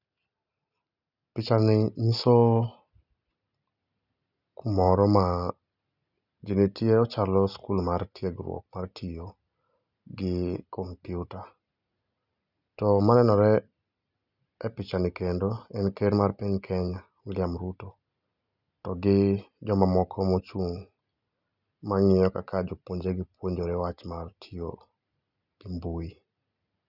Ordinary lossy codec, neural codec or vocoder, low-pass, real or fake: none; none; 5.4 kHz; real